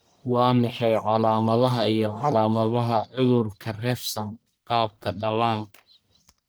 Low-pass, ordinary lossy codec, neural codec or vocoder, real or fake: none; none; codec, 44.1 kHz, 1.7 kbps, Pupu-Codec; fake